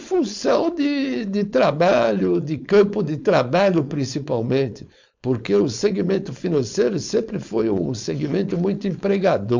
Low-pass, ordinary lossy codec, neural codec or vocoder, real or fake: 7.2 kHz; MP3, 64 kbps; codec, 16 kHz, 4.8 kbps, FACodec; fake